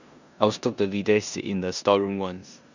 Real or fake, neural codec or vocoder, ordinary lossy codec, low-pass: fake; codec, 16 kHz in and 24 kHz out, 0.9 kbps, LongCat-Audio-Codec, four codebook decoder; none; 7.2 kHz